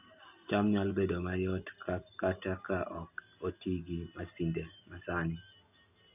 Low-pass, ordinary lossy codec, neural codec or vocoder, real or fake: 3.6 kHz; none; none; real